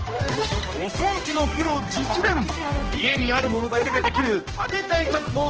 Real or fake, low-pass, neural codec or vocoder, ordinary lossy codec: fake; 7.2 kHz; codec, 16 kHz, 1 kbps, X-Codec, HuBERT features, trained on general audio; Opus, 16 kbps